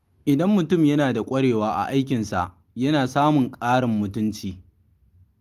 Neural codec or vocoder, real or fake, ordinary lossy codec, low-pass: none; real; Opus, 32 kbps; 14.4 kHz